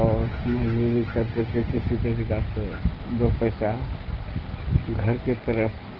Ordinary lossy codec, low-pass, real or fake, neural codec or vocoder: Opus, 16 kbps; 5.4 kHz; real; none